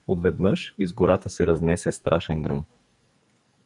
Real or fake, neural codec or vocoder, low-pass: fake; codec, 44.1 kHz, 2.6 kbps, SNAC; 10.8 kHz